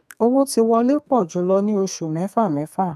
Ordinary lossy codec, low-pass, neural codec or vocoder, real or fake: none; 14.4 kHz; codec, 32 kHz, 1.9 kbps, SNAC; fake